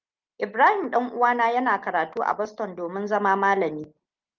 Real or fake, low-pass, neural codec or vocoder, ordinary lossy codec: real; 7.2 kHz; none; Opus, 24 kbps